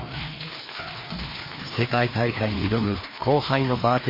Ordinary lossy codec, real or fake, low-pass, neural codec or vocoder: MP3, 24 kbps; fake; 5.4 kHz; codec, 16 kHz, 2 kbps, FreqCodec, larger model